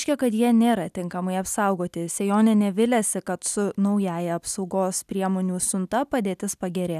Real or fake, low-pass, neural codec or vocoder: real; 14.4 kHz; none